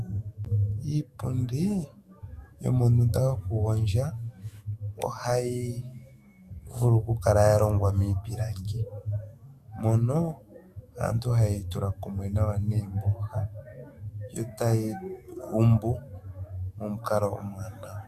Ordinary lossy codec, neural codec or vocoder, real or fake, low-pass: Opus, 64 kbps; autoencoder, 48 kHz, 128 numbers a frame, DAC-VAE, trained on Japanese speech; fake; 14.4 kHz